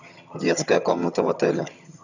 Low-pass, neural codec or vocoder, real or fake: 7.2 kHz; vocoder, 22.05 kHz, 80 mel bands, HiFi-GAN; fake